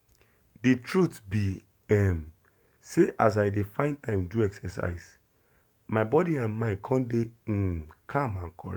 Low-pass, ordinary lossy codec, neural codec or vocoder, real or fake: 19.8 kHz; MP3, 96 kbps; codec, 44.1 kHz, 7.8 kbps, DAC; fake